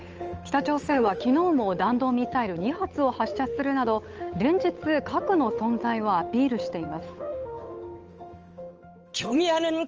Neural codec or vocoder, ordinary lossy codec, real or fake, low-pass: codec, 16 kHz, 8 kbps, FunCodec, trained on Chinese and English, 25 frames a second; Opus, 24 kbps; fake; 7.2 kHz